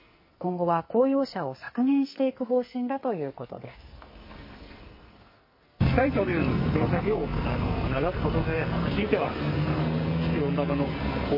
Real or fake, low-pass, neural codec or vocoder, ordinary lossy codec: fake; 5.4 kHz; codec, 44.1 kHz, 2.6 kbps, SNAC; MP3, 24 kbps